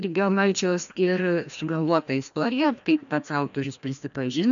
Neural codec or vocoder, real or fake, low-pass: codec, 16 kHz, 1 kbps, FreqCodec, larger model; fake; 7.2 kHz